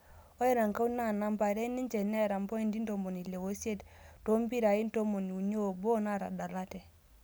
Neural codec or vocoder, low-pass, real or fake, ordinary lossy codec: none; none; real; none